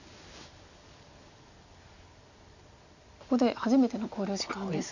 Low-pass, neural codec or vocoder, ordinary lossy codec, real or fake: 7.2 kHz; none; none; real